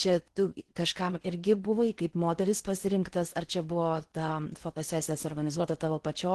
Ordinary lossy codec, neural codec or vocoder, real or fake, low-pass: Opus, 16 kbps; codec, 16 kHz in and 24 kHz out, 0.8 kbps, FocalCodec, streaming, 65536 codes; fake; 10.8 kHz